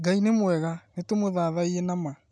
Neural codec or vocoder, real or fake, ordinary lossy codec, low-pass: none; real; none; none